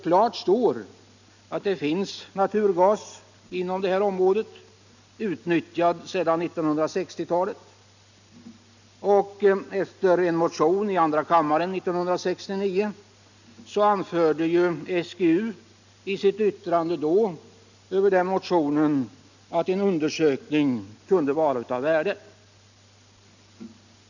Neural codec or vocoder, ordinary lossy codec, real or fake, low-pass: none; none; real; 7.2 kHz